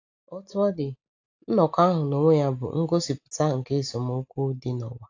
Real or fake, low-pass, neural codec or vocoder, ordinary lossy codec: real; 7.2 kHz; none; AAC, 48 kbps